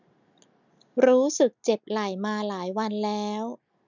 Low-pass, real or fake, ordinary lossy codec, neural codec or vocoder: 7.2 kHz; real; none; none